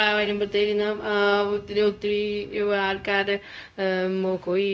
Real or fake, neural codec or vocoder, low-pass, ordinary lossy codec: fake; codec, 16 kHz, 0.4 kbps, LongCat-Audio-Codec; none; none